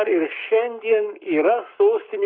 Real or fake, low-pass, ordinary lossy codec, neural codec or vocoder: fake; 5.4 kHz; Opus, 64 kbps; codec, 44.1 kHz, 7.8 kbps, Pupu-Codec